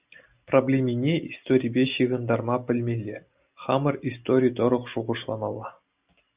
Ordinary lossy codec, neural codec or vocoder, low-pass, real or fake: Opus, 64 kbps; none; 3.6 kHz; real